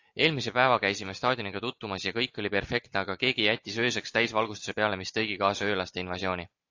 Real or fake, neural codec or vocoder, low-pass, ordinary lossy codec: real; none; 7.2 kHz; AAC, 48 kbps